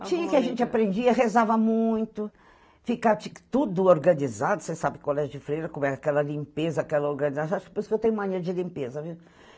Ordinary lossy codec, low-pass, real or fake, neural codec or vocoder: none; none; real; none